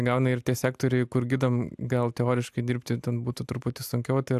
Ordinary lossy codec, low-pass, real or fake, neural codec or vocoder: AAC, 96 kbps; 14.4 kHz; real; none